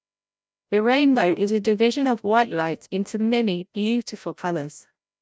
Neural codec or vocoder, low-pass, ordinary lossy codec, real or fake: codec, 16 kHz, 0.5 kbps, FreqCodec, larger model; none; none; fake